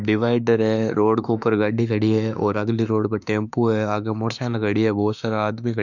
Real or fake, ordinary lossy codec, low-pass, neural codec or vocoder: fake; none; 7.2 kHz; autoencoder, 48 kHz, 32 numbers a frame, DAC-VAE, trained on Japanese speech